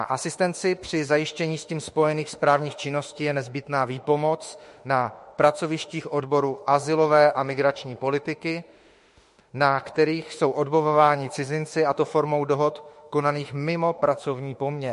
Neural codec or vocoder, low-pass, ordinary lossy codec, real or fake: autoencoder, 48 kHz, 32 numbers a frame, DAC-VAE, trained on Japanese speech; 14.4 kHz; MP3, 48 kbps; fake